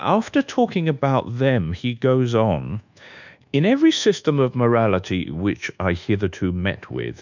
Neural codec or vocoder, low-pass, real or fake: codec, 24 kHz, 1.2 kbps, DualCodec; 7.2 kHz; fake